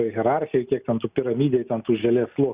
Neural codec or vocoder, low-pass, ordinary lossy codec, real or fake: none; 3.6 kHz; Opus, 64 kbps; real